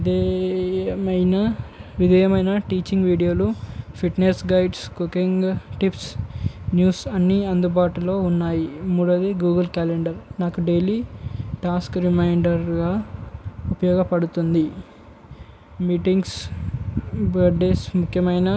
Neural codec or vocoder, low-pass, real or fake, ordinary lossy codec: none; none; real; none